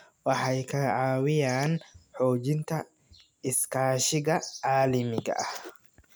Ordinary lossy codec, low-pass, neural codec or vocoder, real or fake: none; none; none; real